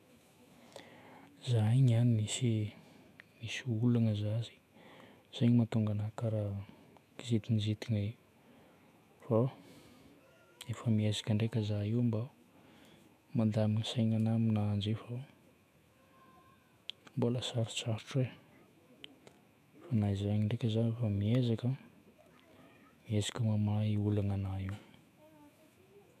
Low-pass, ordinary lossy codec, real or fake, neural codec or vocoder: 14.4 kHz; none; fake; autoencoder, 48 kHz, 128 numbers a frame, DAC-VAE, trained on Japanese speech